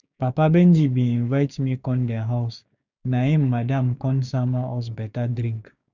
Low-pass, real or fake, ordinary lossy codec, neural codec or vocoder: 7.2 kHz; fake; none; codec, 16 kHz, 6 kbps, DAC